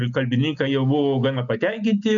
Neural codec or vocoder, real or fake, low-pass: none; real; 7.2 kHz